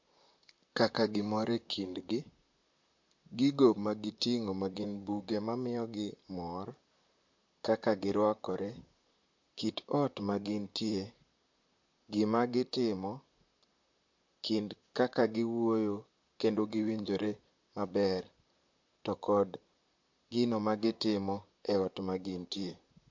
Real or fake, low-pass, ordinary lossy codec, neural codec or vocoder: fake; 7.2 kHz; MP3, 48 kbps; vocoder, 44.1 kHz, 128 mel bands, Pupu-Vocoder